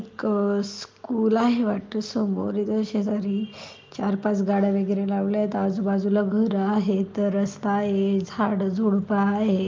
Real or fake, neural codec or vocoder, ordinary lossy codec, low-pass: real; none; Opus, 24 kbps; 7.2 kHz